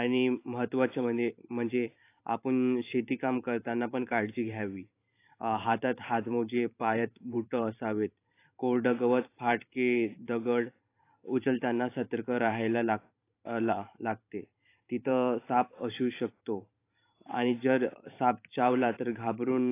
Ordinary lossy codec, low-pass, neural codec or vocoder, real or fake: AAC, 24 kbps; 3.6 kHz; vocoder, 44.1 kHz, 128 mel bands every 512 samples, BigVGAN v2; fake